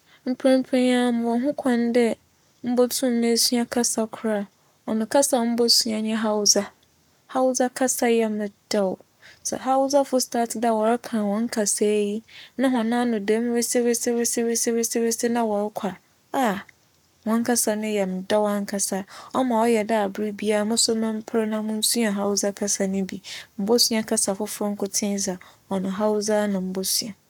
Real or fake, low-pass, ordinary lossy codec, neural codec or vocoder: fake; 19.8 kHz; none; codec, 44.1 kHz, 7.8 kbps, Pupu-Codec